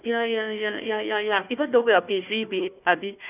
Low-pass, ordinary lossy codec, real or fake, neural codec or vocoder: 3.6 kHz; none; fake; codec, 16 kHz, 1 kbps, FunCodec, trained on Chinese and English, 50 frames a second